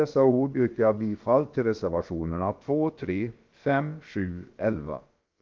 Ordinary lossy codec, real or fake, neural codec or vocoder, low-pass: Opus, 32 kbps; fake; codec, 16 kHz, about 1 kbps, DyCAST, with the encoder's durations; 7.2 kHz